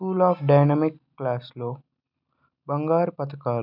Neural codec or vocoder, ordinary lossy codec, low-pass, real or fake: none; none; 5.4 kHz; real